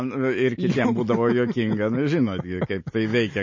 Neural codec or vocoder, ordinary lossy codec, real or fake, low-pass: none; MP3, 32 kbps; real; 7.2 kHz